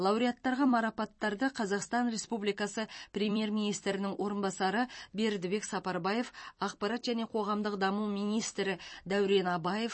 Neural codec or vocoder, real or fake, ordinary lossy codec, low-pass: vocoder, 44.1 kHz, 128 mel bands every 256 samples, BigVGAN v2; fake; MP3, 32 kbps; 9.9 kHz